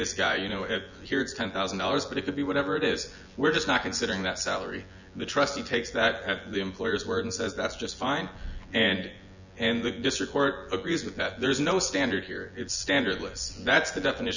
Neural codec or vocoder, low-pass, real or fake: vocoder, 24 kHz, 100 mel bands, Vocos; 7.2 kHz; fake